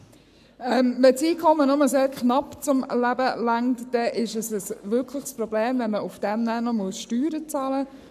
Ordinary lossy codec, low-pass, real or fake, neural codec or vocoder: none; 14.4 kHz; fake; codec, 44.1 kHz, 7.8 kbps, Pupu-Codec